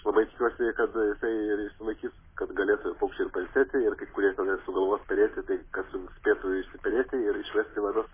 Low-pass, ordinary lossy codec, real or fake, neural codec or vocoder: 3.6 kHz; MP3, 16 kbps; real; none